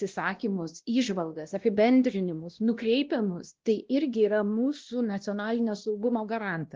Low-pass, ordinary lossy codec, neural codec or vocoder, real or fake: 7.2 kHz; Opus, 16 kbps; codec, 16 kHz, 1 kbps, X-Codec, WavLM features, trained on Multilingual LibriSpeech; fake